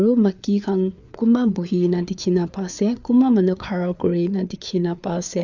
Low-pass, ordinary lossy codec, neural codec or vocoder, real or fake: 7.2 kHz; none; codec, 16 kHz, 4 kbps, FreqCodec, larger model; fake